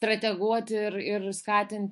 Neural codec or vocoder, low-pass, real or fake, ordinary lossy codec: autoencoder, 48 kHz, 128 numbers a frame, DAC-VAE, trained on Japanese speech; 14.4 kHz; fake; MP3, 48 kbps